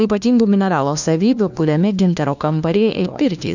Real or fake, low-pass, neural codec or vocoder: fake; 7.2 kHz; codec, 16 kHz, 1 kbps, FunCodec, trained on LibriTTS, 50 frames a second